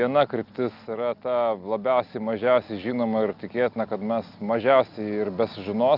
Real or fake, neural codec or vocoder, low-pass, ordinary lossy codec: real; none; 5.4 kHz; Opus, 24 kbps